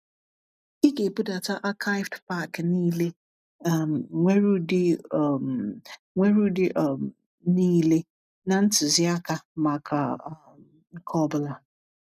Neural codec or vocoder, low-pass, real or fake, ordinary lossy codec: none; 14.4 kHz; real; none